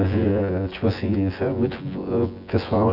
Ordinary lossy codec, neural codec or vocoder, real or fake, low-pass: none; vocoder, 24 kHz, 100 mel bands, Vocos; fake; 5.4 kHz